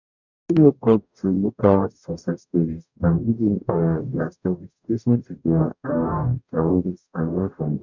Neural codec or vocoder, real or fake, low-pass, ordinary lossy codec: codec, 44.1 kHz, 0.9 kbps, DAC; fake; 7.2 kHz; none